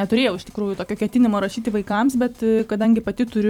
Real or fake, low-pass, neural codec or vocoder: fake; 19.8 kHz; vocoder, 44.1 kHz, 128 mel bands every 256 samples, BigVGAN v2